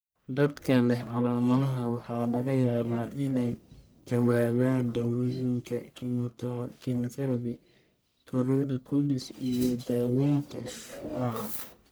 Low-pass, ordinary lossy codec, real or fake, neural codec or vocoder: none; none; fake; codec, 44.1 kHz, 1.7 kbps, Pupu-Codec